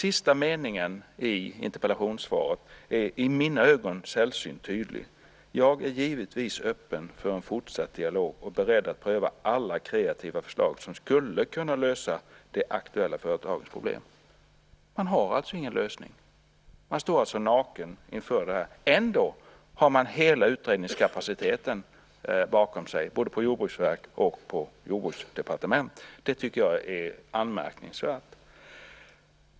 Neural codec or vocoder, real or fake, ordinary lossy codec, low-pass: none; real; none; none